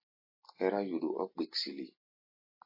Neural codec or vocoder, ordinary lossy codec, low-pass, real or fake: none; MP3, 24 kbps; 5.4 kHz; real